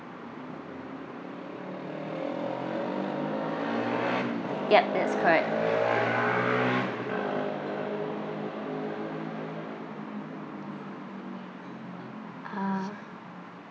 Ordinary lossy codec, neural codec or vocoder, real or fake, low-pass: none; none; real; none